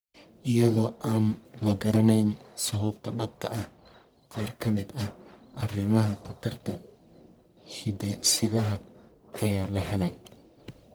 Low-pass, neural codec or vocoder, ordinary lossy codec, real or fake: none; codec, 44.1 kHz, 1.7 kbps, Pupu-Codec; none; fake